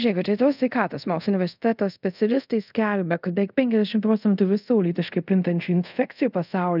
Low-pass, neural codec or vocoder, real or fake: 5.4 kHz; codec, 24 kHz, 0.5 kbps, DualCodec; fake